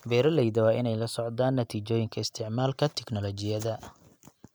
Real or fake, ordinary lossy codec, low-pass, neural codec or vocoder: real; none; none; none